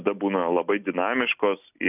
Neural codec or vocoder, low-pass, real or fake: none; 3.6 kHz; real